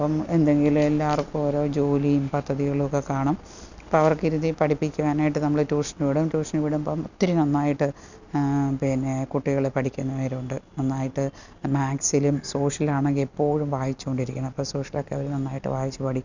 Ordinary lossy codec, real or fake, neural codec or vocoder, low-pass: none; real; none; 7.2 kHz